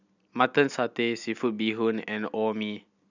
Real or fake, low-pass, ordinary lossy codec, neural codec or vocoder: real; 7.2 kHz; none; none